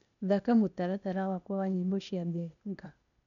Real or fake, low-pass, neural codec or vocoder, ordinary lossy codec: fake; 7.2 kHz; codec, 16 kHz, 0.8 kbps, ZipCodec; none